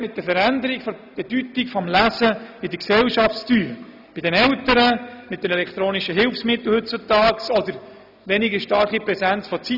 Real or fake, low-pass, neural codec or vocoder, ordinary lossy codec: real; 5.4 kHz; none; none